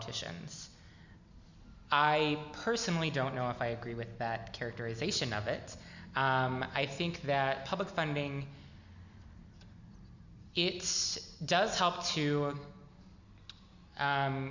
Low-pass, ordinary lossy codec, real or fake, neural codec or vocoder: 7.2 kHz; Opus, 64 kbps; real; none